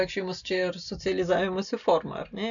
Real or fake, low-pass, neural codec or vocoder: real; 7.2 kHz; none